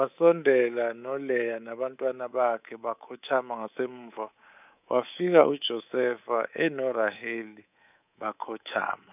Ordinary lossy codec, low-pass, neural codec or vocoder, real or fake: none; 3.6 kHz; codec, 24 kHz, 3.1 kbps, DualCodec; fake